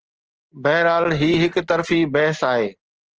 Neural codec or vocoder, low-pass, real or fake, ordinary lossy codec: none; 7.2 kHz; real; Opus, 16 kbps